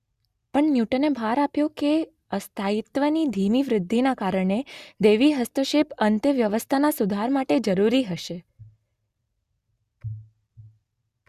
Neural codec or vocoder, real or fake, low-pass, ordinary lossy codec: none; real; 14.4 kHz; Opus, 64 kbps